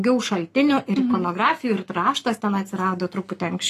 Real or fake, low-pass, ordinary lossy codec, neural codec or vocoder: fake; 14.4 kHz; AAC, 48 kbps; codec, 44.1 kHz, 7.8 kbps, Pupu-Codec